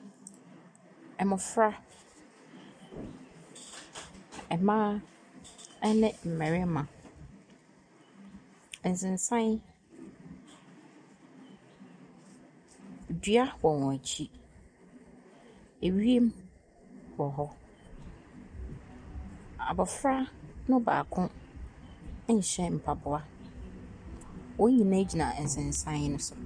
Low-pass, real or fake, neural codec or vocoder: 9.9 kHz; real; none